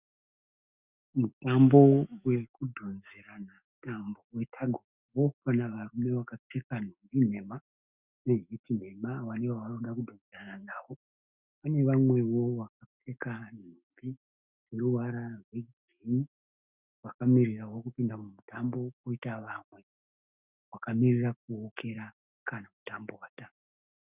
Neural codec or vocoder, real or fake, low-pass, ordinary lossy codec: autoencoder, 48 kHz, 128 numbers a frame, DAC-VAE, trained on Japanese speech; fake; 3.6 kHz; Opus, 64 kbps